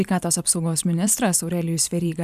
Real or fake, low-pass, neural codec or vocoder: fake; 14.4 kHz; vocoder, 44.1 kHz, 128 mel bands every 512 samples, BigVGAN v2